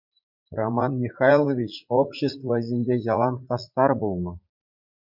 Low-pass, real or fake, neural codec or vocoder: 5.4 kHz; fake; vocoder, 44.1 kHz, 80 mel bands, Vocos